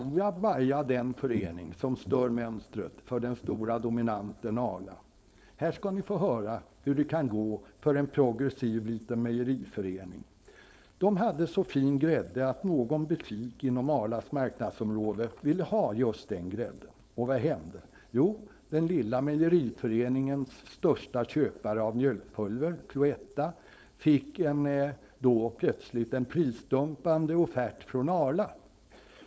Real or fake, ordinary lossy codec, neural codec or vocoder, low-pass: fake; none; codec, 16 kHz, 4.8 kbps, FACodec; none